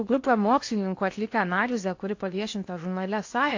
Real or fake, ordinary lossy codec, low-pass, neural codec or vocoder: fake; AAC, 48 kbps; 7.2 kHz; codec, 16 kHz in and 24 kHz out, 0.8 kbps, FocalCodec, streaming, 65536 codes